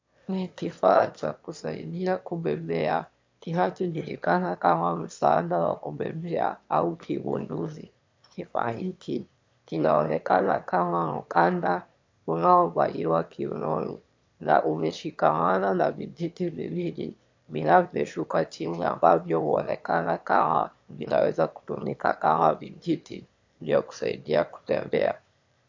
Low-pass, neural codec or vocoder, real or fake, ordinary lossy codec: 7.2 kHz; autoencoder, 22.05 kHz, a latent of 192 numbers a frame, VITS, trained on one speaker; fake; MP3, 48 kbps